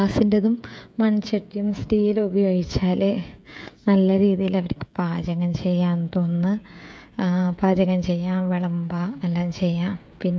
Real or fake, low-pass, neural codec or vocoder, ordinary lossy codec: fake; none; codec, 16 kHz, 16 kbps, FreqCodec, smaller model; none